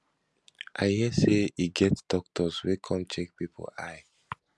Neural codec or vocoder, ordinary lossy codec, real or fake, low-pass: none; none; real; none